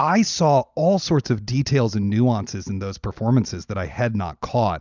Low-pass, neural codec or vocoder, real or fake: 7.2 kHz; none; real